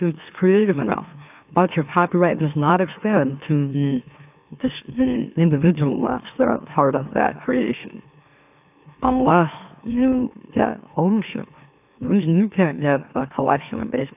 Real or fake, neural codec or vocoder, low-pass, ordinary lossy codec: fake; autoencoder, 44.1 kHz, a latent of 192 numbers a frame, MeloTTS; 3.6 kHz; AAC, 32 kbps